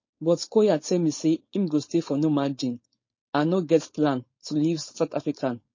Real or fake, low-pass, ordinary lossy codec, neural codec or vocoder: fake; 7.2 kHz; MP3, 32 kbps; codec, 16 kHz, 4.8 kbps, FACodec